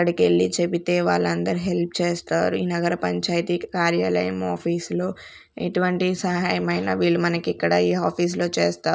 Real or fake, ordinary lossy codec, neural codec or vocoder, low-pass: real; none; none; none